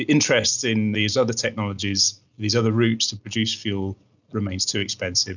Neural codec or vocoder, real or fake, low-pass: none; real; 7.2 kHz